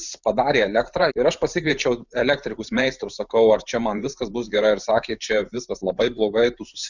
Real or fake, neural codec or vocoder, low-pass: fake; vocoder, 44.1 kHz, 128 mel bands every 512 samples, BigVGAN v2; 7.2 kHz